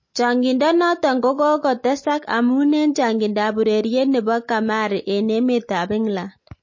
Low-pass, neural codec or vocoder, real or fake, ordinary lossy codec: 7.2 kHz; none; real; MP3, 32 kbps